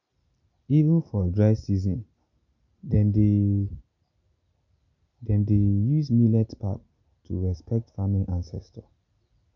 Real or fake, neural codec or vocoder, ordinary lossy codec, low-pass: real; none; none; 7.2 kHz